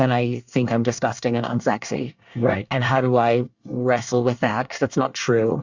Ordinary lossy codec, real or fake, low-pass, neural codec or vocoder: Opus, 64 kbps; fake; 7.2 kHz; codec, 24 kHz, 1 kbps, SNAC